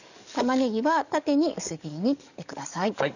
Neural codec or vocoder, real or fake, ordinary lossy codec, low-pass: codec, 44.1 kHz, 7.8 kbps, DAC; fake; none; 7.2 kHz